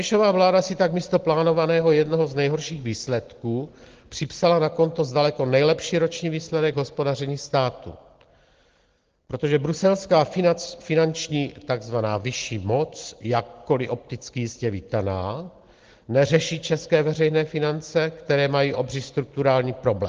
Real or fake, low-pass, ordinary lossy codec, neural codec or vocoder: real; 7.2 kHz; Opus, 16 kbps; none